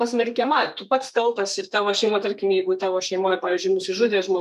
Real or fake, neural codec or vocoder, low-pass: fake; codec, 32 kHz, 1.9 kbps, SNAC; 14.4 kHz